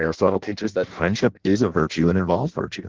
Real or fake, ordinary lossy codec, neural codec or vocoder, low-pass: fake; Opus, 16 kbps; codec, 16 kHz in and 24 kHz out, 0.6 kbps, FireRedTTS-2 codec; 7.2 kHz